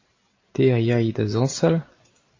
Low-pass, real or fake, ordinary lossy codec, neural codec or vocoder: 7.2 kHz; real; AAC, 32 kbps; none